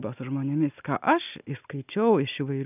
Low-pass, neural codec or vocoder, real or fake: 3.6 kHz; none; real